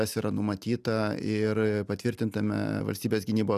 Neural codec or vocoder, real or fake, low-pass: none; real; 14.4 kHz